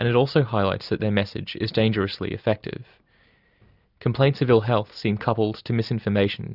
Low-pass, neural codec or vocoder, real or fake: 5.4 kHz; none; real